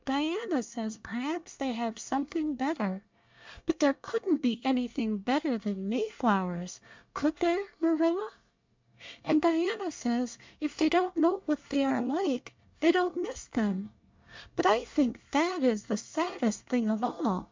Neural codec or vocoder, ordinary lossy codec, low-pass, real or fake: codec, 24 kHz, 1 kbps, SNAC; MP3, 64 kbps; 7.2 kHz; fake